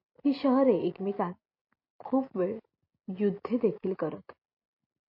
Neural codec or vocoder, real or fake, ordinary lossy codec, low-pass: none; real; MP3, 32 kbps; 5.4 kHz